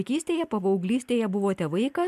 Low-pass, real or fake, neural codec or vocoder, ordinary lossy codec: 14.4 kHz; real; none; MP3, 96 kbps